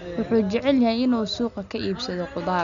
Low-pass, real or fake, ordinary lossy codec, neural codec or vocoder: 7.2 kHz; real; none; none